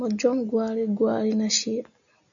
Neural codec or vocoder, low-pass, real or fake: none; 7.2 kHz; real